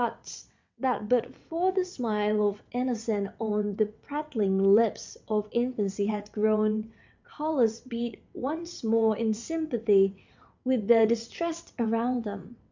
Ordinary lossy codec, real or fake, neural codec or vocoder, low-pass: MP3, 64 kbps; fake; vocoder, 22.05 kHz, 80 mel bands, WaveNeXt; 7.2 kHz